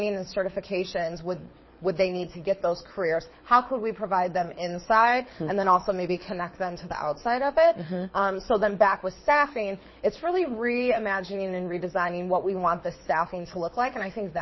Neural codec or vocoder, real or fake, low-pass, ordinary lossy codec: codec, 24 kHz, 6 kbps, HILCodec; fake; 7.2 kHz; MP3, 24 kbps